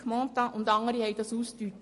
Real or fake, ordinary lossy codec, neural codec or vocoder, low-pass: real; MP3, 48 kbps; none; 14.4 kHz